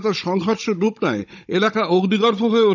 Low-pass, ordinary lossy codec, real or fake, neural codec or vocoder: 7.2 kHz; none; fake; codec, 16 kHz, 16 kbps, FunCodec, trained on Chinese and English, 50 frames a second